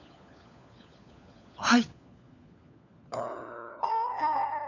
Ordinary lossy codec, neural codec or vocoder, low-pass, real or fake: none; codec, 16 kHz, 4 kbps, FunCodec, trained on LibriTTS, 50 frames a second; 7.2 kHz; fake